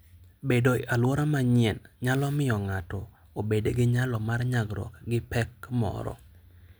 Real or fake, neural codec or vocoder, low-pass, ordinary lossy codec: real; none; none; none